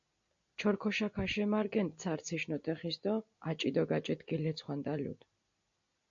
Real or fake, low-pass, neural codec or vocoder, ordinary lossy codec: real; 7.2 kHz; none; AAC, 64 kbps